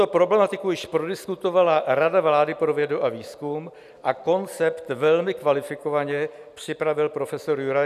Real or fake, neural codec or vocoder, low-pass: real; none; 14.4 kHz